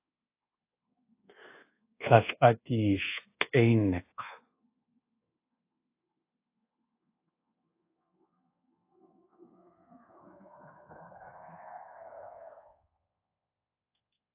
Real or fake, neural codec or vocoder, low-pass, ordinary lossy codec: fake; codec, 24 kHz, 1.2 kbps, DualCodec; 3.6 kHz; AAC, 24 kbps